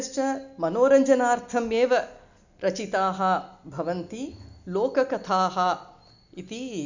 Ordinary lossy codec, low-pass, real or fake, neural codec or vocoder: none; 7.2 kHz; real; none